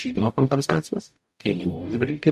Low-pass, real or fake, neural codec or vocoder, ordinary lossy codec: 14.4 kHz; fake; codec, 44.1 kHz, 0.9 kbps, DAC; MP3, 64 kbps